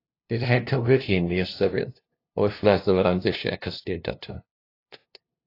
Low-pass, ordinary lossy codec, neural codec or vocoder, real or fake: 5.4 kHz; AAC, 32 kbps; codec, 16 kHz, 0.5 kbps, FunCodec, trained on LibriTTS, 25 frames a second; fake